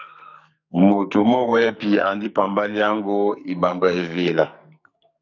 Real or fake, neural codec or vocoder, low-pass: fake; codec, 44.1 kHz, 2.6 kbps, SNAC; 7.2 kHz